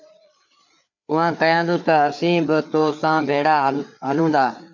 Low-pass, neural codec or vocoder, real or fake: 7.2 kHz; codec, 16 kHz, 4 kbps, FreqCodec, larger model; fake